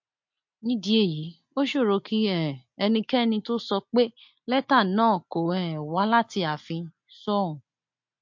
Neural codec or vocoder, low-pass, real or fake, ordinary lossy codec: none; 7.2 kHz; real; MP3, 48 kbps